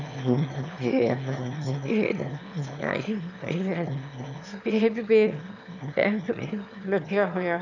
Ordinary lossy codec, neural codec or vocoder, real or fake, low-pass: none; autoencoder, 22.05 kHz, a latent of 192 numbers a frame, VITS, trained on one speaker; fake; 7.2 kHz